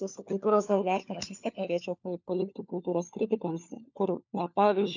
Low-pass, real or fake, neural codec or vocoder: 7.2 kHz; fake; codec, 16 kHz, 4 kbps, FunCodec, trained on LibriTTS, 50 frames a second